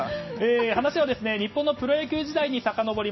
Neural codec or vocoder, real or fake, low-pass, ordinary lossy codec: none; real; 7.2 kHz; MP3, 24 kbps